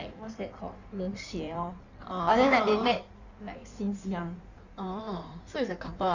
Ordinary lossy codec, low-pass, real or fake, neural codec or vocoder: none; 7.2 kHz; fake; codec, 16 kHz in and 24 kHz out, 1.1 kbps, FireRedTTS-2 codec